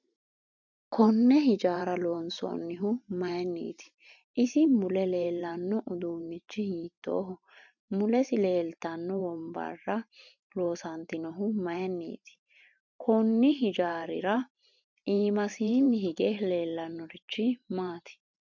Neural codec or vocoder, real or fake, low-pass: vocoder, 44.1 kHz, 128 mel bands every 512 samples, BigVGAN v2; fake; 7.2 kHz